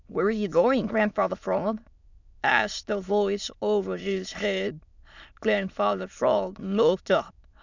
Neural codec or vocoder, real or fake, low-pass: autoencoder, 22.05 kHz, a latent of 192 numbers a frame, VITS, trained on many speakers; fake; 7.2 kHz